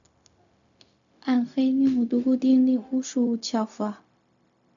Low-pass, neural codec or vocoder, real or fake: 7.2 kHz; codec, 16 kHz, 0.4 kbps, LongCat-Audio-Codec; fake